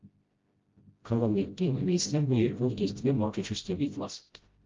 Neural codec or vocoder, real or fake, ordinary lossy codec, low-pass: codec, 16 kHz, 0.5 kbps, FreqCodec, smaller model; fake; Opus, 32 kbps; 7.2 kHz